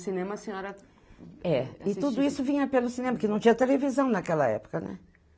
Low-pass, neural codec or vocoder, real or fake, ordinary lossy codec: none; none; real; none